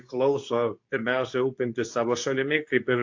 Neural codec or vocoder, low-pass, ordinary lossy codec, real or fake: codec, 24 kHz, 0.9 kbps, WavTokenizer, medium speech release version 2; 7.2 kHz; AAC, 48 kbps; fake